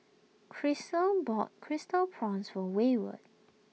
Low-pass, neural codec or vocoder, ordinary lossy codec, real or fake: none; none; none; real